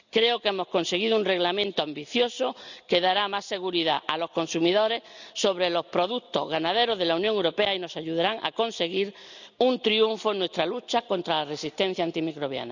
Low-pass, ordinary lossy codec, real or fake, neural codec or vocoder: 7.2 kHz; none; real; none